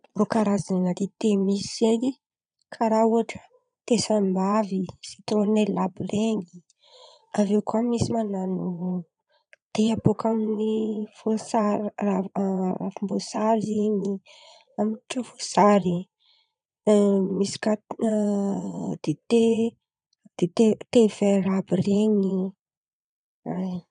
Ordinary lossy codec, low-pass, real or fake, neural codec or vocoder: none; 9.9 kHz; fake; vocoder, 22.05 kHz, 80 mel bands, Vocos